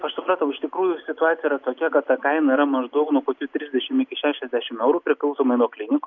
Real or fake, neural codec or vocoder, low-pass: real; none; 7.2 kHz